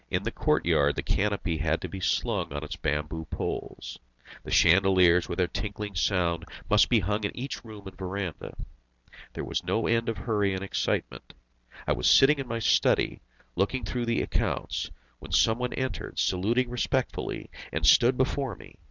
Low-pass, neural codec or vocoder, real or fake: 7.2 kHz; none; real